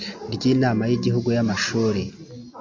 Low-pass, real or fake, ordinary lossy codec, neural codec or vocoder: 7.2 kHz; real; MP3, 48 kbps; none